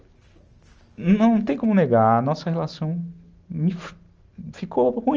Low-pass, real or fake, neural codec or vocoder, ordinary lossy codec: 7.2 kHz; real; none; Opus, 24 kbps